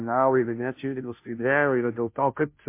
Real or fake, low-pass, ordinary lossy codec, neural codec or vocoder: fake; 3.6 kHz; MP3, 24 kbps; codec, 16 kHz, 0.5 kbps, FunCodec, trained on Chinese and English, 25 frames a second